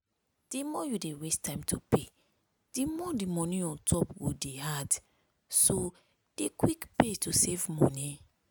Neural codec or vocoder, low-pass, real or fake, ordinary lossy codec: none; none; real; none